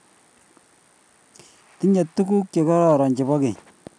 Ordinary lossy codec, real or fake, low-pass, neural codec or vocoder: none; real; 9.9 kHz; none